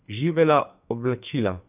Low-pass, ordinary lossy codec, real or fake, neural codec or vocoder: 3.6 kHz; none; fake; codec, 44.1 kHz, 2.6 kbps, SNAC